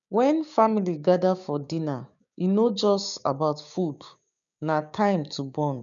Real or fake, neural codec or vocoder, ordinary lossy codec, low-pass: fake; codec, 16 kHz, 6 kbps, DAC; none; 7.2 kHz